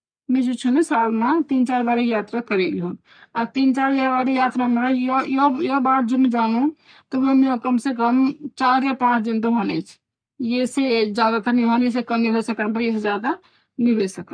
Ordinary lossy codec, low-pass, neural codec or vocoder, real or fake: none; 9.9 kHz; codec, 44.1 kHz, 3.4 kbps, Pupu-Codec; fake